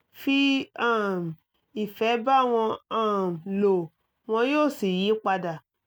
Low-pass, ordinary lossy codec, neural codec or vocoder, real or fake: none; none; none; real